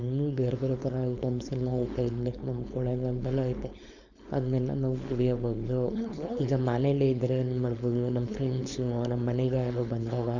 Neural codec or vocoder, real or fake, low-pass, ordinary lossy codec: codec, 16 kHz, 4.8 kbps, FACodec; fake; 7.2 kHz; none